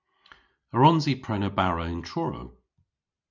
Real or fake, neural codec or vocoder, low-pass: real; none; 7.2 kHz